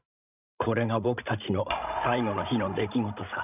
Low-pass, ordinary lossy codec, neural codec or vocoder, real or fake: 3.6 kHz; none; codec, 16 kHz, 16 kbps, FreqCodec, larger model; fake